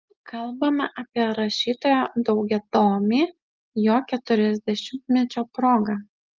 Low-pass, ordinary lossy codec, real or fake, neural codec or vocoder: 7.2 kHz; Opus, 32 kbps; real; none